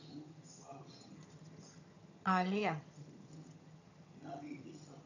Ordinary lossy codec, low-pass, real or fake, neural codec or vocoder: none; 7.2 kHz; fake; vocoder, 22.05 kHz, 80 mel bands, HiFi-GAN